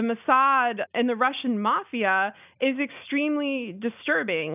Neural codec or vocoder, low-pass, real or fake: none; 3.6 kHz; real